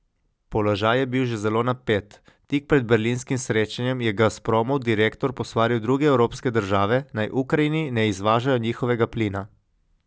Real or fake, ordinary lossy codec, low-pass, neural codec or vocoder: real; none; none; none